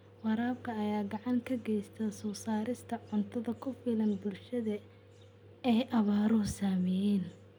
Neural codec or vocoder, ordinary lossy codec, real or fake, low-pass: none; none; real; none